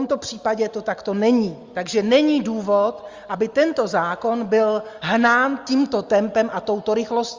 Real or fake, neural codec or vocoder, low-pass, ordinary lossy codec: real; none; 7.2 kHz; Opus, 32 kbps